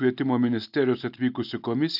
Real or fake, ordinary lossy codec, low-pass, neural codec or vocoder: real; AAC, 48 kbps; 5.4 kHz; none